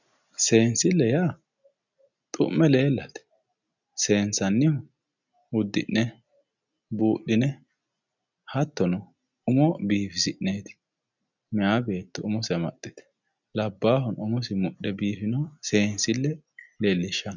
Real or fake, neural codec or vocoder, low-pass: real; none; 7.2 kHz